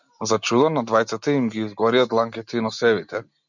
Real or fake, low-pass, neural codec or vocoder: real; 7.2 kHz; none